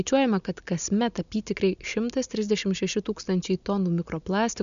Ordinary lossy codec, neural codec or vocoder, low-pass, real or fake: MP3, 96 kbps; none; 7.2 kHz; real